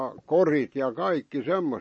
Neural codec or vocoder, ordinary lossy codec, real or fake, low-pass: none; MP3, 32 kbps; real; 9.9 kHz